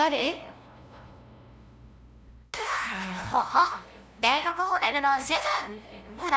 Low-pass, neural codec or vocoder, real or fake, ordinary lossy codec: none; codec, 16 kHz, 0.5 kbps, FunCodec, trained on LibriTTS, 25 frames a second; fake; none